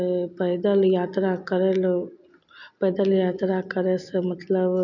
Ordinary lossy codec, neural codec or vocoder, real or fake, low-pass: none; none; real; 7.2 kHz